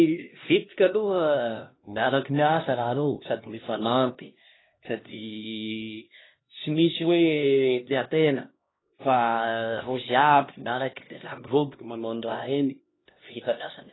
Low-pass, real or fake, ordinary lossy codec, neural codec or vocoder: 7.2 kHz; fake; AAC, 16 kbps; codec, 16 kHz, 1 kbps, X-Codec, HuBERT features, trained on LibriSpeech